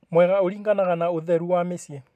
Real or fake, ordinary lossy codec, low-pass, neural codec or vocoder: real; none; 14.4 kHz; none